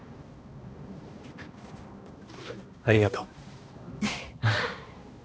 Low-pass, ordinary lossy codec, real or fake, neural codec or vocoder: none; none; fake; codec, 16 kHz, 1 kbps, X-Codec, HuBERT features, trained on balanced general audio